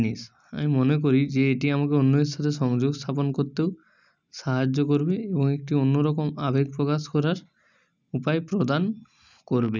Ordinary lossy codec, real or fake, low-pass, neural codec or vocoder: none; real; 7.2 kHz; none